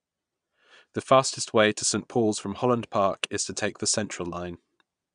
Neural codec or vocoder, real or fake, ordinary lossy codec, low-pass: vocoder, 22.05 kHz, 80 mel bands, Vocos; fake; none; 9.9 kHz